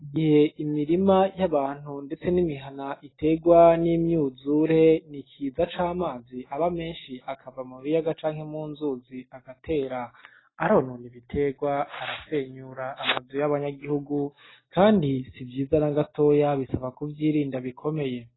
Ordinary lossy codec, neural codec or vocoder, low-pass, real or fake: AAC, 16 kbps; none; 7.2 kHz; real